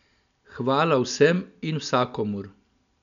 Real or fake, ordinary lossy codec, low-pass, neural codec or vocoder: real; none; 7.2 kHz; none